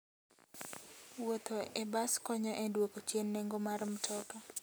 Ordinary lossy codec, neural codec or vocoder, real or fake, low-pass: none; none; real; none